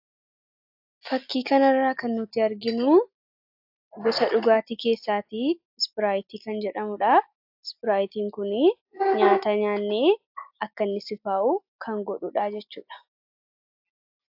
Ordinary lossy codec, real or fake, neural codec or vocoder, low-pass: AAC, 48 kbps; real; none; 5.4 kHz